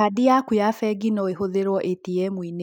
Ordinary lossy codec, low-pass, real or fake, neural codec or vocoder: none; 19.8 kHz; real; none